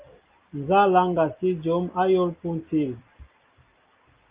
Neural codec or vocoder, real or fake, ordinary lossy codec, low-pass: none; real; Opus, 32 kbps; 3.6 kHz